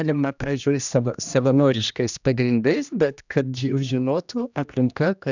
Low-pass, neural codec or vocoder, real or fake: 7.2 kHz; codec, 16 kHz, 1 kbps, X-Codec, HuBERT features, trained on general audio; fake